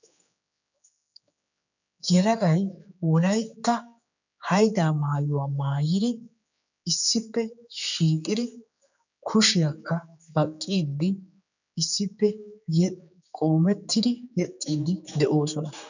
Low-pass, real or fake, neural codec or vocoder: 7.2 kHz; fake; codec, 16 kHz, 4 kbps, X-Codec, HuBERT features, trained on general audio